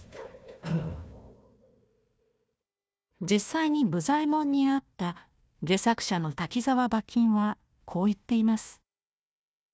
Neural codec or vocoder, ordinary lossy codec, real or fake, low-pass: codec, 16 kHz, 1 kbps, FunCodec, trained on Chinese and English, 50 frames a second; none; fake; none